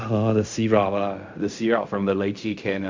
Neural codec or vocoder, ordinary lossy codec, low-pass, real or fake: codec, 16 kHz in and 24 kHz out, 0.4 kbps, LongCat-Audio-Codec, fine tuned four codebook decoder; MP3, 64 kbps; 7.2 kHz; fake